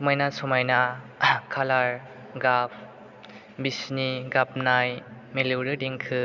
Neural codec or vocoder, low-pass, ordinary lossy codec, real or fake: none; 7.2 kHz; none; real